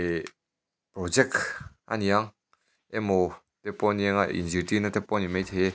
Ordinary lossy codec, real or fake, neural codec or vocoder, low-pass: none; real; none; none